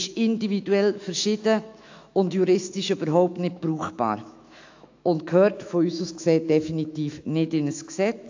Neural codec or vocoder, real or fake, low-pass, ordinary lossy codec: autoencoder, 48 kHz, 128 numbers a frame, DAC-VAE, trained on Japanese speech; fake; 7.2 kHz; AAC, 48 kbps